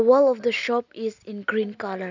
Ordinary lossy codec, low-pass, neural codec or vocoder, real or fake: none; 7.2 kHz; none; real